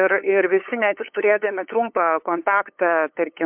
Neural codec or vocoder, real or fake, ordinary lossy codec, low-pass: codec, 16 kHz, 8 kbps, FunCodec, trained on LibriTTS, 25 frames a second; fake; MP3, 32 kbps; 3.6 kHz